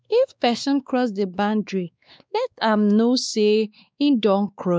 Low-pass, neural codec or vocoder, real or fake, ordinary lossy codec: none; codec, 16 kHz, 2 kbps, X-Codec, WavLM features, trained on Multilingual LibriSpeech; fake; none